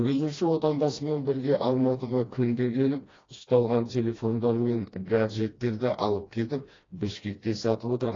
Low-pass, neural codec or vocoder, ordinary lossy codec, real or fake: 7.2 kHz; codec, 16 kHz, 1 kbps, FreqCodec, smaller model; AAC, 32 kbps; fake